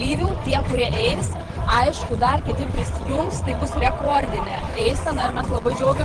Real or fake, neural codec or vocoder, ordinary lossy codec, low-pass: fake; vocoder, 22.05 kHz, 80 mel bands, WaveNeXt; Opus, 16 kbps; 9.9 kHz